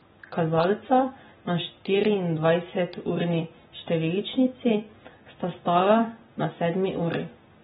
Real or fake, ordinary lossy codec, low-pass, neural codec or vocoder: real; AAC, 16 kbps; 19.8 kHz; none